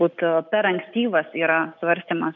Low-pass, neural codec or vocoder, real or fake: 7.2 kHz; none; real